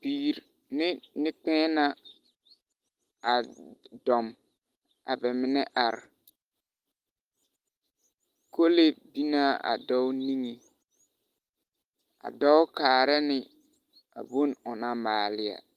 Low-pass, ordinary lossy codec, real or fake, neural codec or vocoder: 14.4 kHz; Opus, 24 kbps; real; none